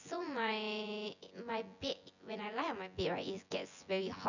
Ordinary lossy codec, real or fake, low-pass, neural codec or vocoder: none; fake; 7.2 kHz; vocoder, 24 kHz, 100 mel bands, Vocos